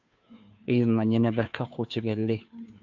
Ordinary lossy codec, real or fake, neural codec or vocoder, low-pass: AAC, 48 kbps; fake; codec, 16 kHz, 2 kbps, FunCodec, trained on Chinese and English, 25 frames a second; 7.2 kHz